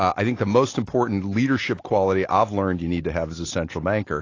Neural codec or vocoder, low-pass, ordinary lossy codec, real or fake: none; 7.2 kHz; AAC, 32 kbps; real